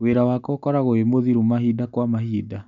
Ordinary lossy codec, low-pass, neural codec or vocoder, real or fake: none; 7.2 kHz; none; real